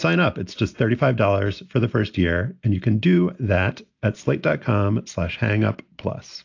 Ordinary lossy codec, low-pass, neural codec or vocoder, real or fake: AAC, 48 kbps; 7.2 kHz; none; real